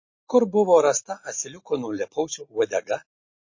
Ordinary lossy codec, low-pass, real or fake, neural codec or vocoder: MP3, 32 kbps; 7.2 kHz; real; none